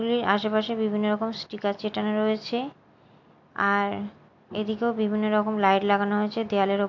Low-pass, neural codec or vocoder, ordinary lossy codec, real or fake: 7.2 kHz; none; AAC, 48 kbps; real